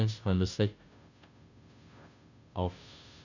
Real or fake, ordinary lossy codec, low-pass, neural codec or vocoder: fake; none; 7.2 kHz; codec, 16 kHz, 0.5 kbps, FunCodec, trained on Chinese and English, 25 frames a second